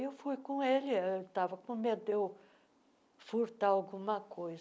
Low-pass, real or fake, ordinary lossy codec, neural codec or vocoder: none; real; none; none